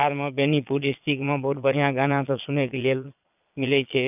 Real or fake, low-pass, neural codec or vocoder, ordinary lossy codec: fake; 3.6 kHz; vocoder, 22.05 kHz, 80 mel bands, Vocos; none